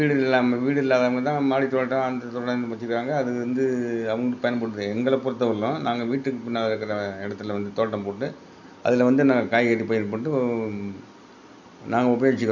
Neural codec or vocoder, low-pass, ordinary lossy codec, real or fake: none; 7.2 kHz; none; real